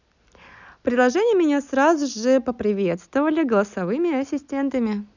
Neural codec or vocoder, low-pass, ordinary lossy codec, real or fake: none; 7.2 kHz; none; real